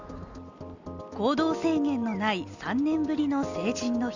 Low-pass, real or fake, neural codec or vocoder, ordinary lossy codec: 7.2 kHz; real; none; Opus, 64 kbps